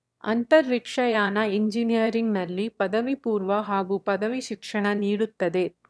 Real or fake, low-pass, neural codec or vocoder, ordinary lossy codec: fake; none; autoencoder, 22.05 kHz, a latent of 192 numbers a frame, VITS, trained on one speaker; none